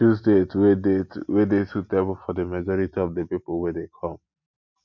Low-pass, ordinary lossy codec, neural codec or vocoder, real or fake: 7.2 kHz; MP3, 48 kbps; none; real